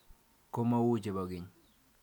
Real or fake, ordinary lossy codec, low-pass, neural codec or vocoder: real; MP3, 96 kbps; 19.8 kHz; none